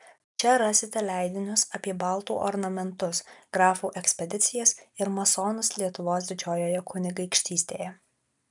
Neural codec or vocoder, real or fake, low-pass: none; real; 10.8 kHz